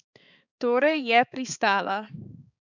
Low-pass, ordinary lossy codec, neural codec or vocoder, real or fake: 7.2 kHz; none; codec, 16 kHz, 4 kbps, X-Codec, HuBERT features, trained on balanced general audio; fake